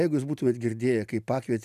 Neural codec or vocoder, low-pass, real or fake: none; 14.4 kHz; real